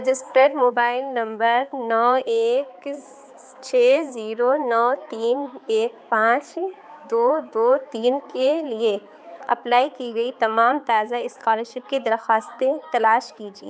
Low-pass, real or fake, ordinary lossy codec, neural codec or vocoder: none; fake; none; codec, 16 kHz, 4 kbps, X-Codec, HuBERT features, trained on balanced general audio